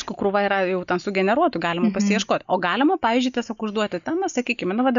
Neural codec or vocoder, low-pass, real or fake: none; 7.2 kHz; real